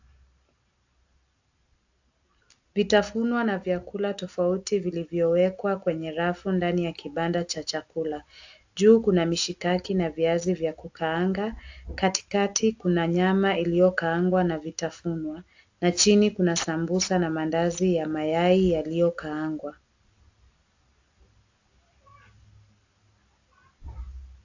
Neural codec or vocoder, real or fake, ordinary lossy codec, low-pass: none; real; AAC, 48 kbps; 7.2 kHz